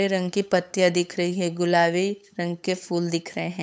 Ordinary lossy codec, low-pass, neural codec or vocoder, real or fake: none; none; codec, 16 kHz, 8 kbps, FunCodec, trained on LibriTTS, 25 frames a second; fake